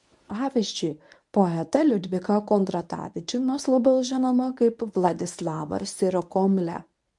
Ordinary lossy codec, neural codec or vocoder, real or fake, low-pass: MP3, 64 kbps; codec, 24 kHz, 0.9 kbps, WavTokenizer, medium speech release version 1; fake; 10.8 kHz